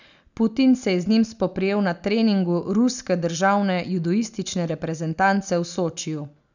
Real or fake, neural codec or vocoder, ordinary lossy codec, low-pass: real; none; none; 7.2 kHz